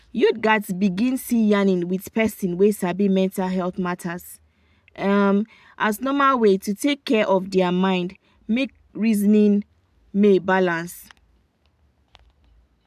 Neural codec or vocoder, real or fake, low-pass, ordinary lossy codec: none; real; 14.4 kHz; AAC, 96 kbps